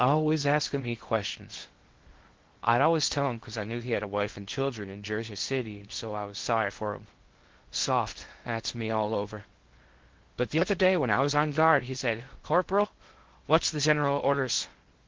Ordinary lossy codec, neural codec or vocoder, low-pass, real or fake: Opus, 16 kbps; codec, 16 kHz in and 24 kHz out, 0.6 kbps, FocalCodec, streaming, 2048 codes; 7.2 kHz; fake